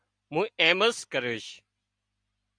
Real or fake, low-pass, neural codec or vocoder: real; 9.9 kHz; none